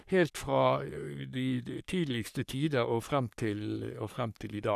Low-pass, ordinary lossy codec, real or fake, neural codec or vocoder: 14.4 kHz; none; fake; codec, 44.1 kHz, 3.4 kbps, Pupu-Codec